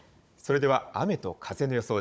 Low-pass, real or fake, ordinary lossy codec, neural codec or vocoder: none; fake; none; codec, 16 kHz, 16 kbps, FunCodec, trained on Chinese and English, 50 frames a second